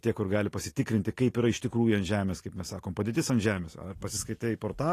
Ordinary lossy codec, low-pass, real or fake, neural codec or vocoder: AAC, 48 kbps; 14.4 kHz; real; none